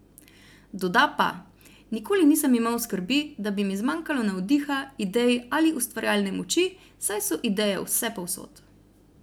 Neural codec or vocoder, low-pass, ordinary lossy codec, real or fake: none; none; none; real